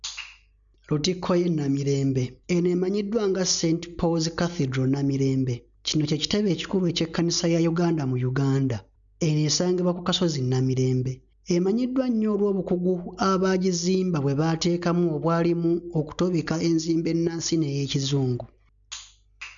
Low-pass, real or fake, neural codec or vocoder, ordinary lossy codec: 7.2 kHz; real; none; none